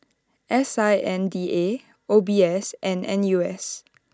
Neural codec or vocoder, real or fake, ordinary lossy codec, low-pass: none; real; none; none